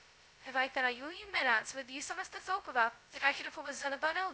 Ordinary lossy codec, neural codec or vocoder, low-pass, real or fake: none; codec, 16 kHz, 0.2 kbps, FocalCodec; none; fake